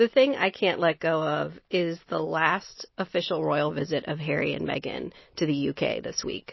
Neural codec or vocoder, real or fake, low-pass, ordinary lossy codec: none; real; 7.2 kHz; MP3, 24 kbps